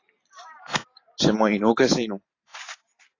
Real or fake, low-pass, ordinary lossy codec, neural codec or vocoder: fake; 7.2 kHz; AAC, 32 kbps; vocoder, 44.1 kHz, 128 mel bands every 512 samples, BigVGAN v2